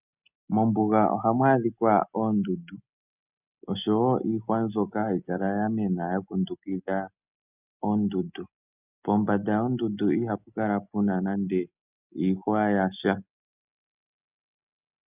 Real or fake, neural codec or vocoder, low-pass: real; none; 3.6 kHz